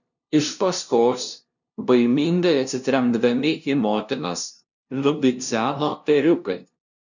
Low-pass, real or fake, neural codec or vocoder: 7.2 kHz; fake; codec, 16 kHz, 0.5 kbps, FunCodec, trained on LibriTTS, 25 frames a second